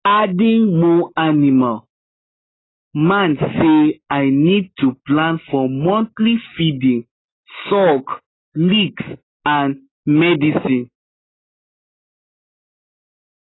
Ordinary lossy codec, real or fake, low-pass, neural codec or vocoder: AAC, 16 kbps; real; 7.2 kHz; none